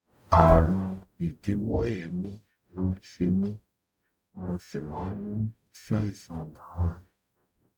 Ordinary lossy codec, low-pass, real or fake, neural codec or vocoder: none; 19.8 kHz; fake; codec, 44.1 kHz, 0.9 kbps, DAC